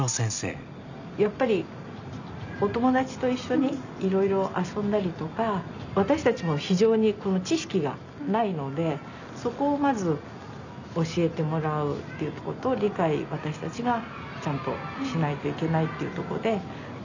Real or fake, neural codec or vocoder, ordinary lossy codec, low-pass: real; none; none; 7.2 kHz